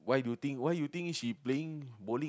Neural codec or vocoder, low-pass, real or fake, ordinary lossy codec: none; none; real; none